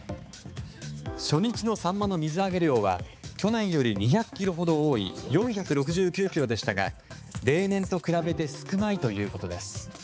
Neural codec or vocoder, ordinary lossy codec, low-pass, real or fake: codec, 16 kHz, 4 kbps, X-Codec, HuBERT features, trained on balanced general audio; none; none; fake